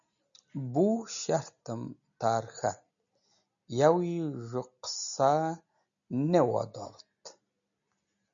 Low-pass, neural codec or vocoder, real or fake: 7.2 kHz; none; real